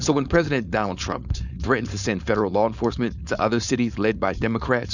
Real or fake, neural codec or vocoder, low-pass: fake; codec, 16 kHz, 4.8 kbps, FACodec; 7.2 kHz